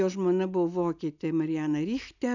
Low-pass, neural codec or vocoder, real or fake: 7.2 kHz; none; real